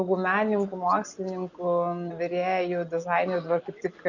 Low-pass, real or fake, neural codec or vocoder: 7.2 kHz; real; none